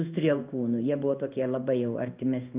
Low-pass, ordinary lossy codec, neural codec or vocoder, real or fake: 3.6 kHz; Opus, 32 kbps; codec, 16 kHz in and 24 kHz out, 1 kbps, XY-Tokenizer; fake